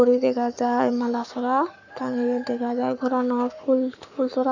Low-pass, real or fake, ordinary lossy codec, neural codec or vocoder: 7.2 kHz; fake; none; codec, 44.1 kHz, 7.8 kbps, Pupu-Codec